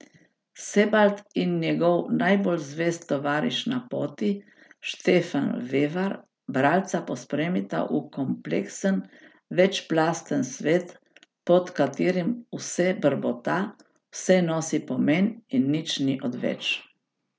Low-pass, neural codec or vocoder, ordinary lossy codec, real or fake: none; none; none; real